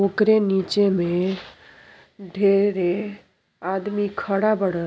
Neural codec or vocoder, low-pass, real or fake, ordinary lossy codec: none; none; real; none